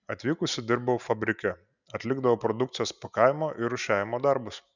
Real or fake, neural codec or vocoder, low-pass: real; none; 7.2 kHz